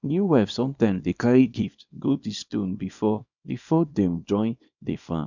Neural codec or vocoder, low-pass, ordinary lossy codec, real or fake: codec, 24 kHz, 0.9 kbps, WavTokenizer, small release; 7.2 kHz; none; fake